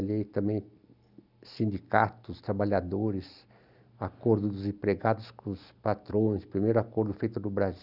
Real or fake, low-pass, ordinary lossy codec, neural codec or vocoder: real; 5.4 kHz; none; none